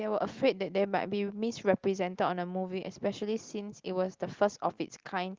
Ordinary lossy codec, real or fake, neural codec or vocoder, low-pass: Opus, 32 kbps; real; none; 7.2 kHz